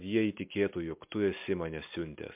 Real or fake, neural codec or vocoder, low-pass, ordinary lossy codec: real; none; 3.6 kHz; MP3, 32 kbps